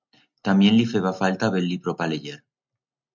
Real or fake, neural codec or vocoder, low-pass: real; none; 7.2 kHz